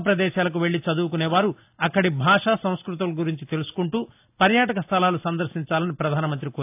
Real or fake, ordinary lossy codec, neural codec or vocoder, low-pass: real; AAC, 32 kbps; none; 3.6 kHz